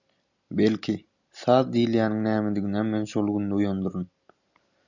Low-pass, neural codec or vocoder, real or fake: 7.2 kHz; none; real